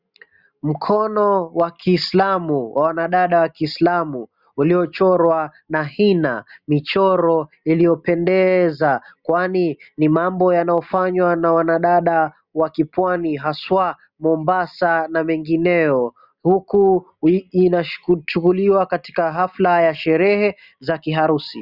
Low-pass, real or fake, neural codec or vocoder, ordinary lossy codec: 5.4 kHz; real; none; Opus, 64 kbps